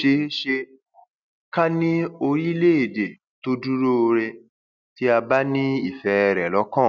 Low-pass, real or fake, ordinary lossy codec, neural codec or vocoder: 7.2 kHz; real; none; none